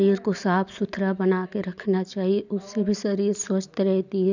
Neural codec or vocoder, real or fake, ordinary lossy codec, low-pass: none; real; none; 7.2 kHz